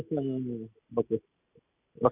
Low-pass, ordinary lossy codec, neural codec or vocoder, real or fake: 3.6 kHz; none; none; real